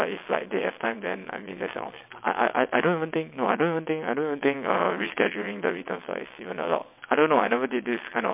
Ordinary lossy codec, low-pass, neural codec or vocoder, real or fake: MP3, 32 kbps; 3.6 kHz; vocoder, 22.05 kHz, 80 mel bands, WaveNeXt; fake